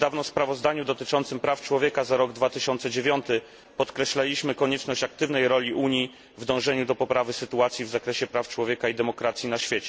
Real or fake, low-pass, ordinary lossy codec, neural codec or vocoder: real; none; none; none